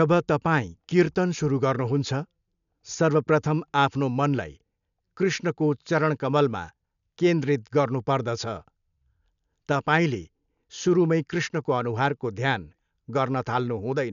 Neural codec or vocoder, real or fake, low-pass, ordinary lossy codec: none; real; 7.2 kHz; none